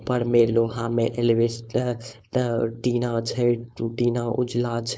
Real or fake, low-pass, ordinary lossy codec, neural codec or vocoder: fake; none; none; codec, 16 kHz, 4.8 kbps, FACodec